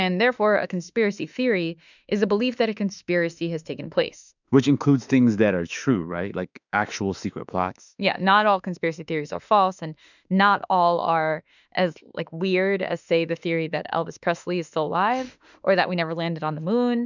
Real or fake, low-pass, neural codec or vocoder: fake; 7.2 kHz; autoencoder, 48 kHz, 32 numbers a frame, DAC-VAE, trained on Japanese speech